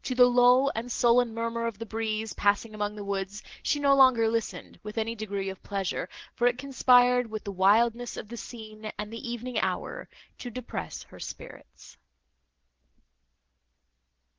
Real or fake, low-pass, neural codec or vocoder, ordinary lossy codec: real; 7.2 kHz; none; Opus, 16 kbps